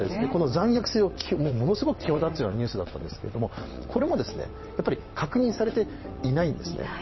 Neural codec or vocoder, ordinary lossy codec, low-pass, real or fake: vocoder, 22.05 kHz, 80 mel bands, WaveNeXt; MP3, 24 kbps; 7.2 kHz; fake